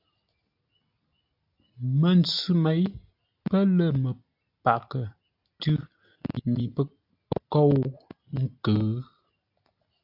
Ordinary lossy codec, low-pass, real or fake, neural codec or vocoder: AAC, 48 kbps; 5.4 kHz; real; none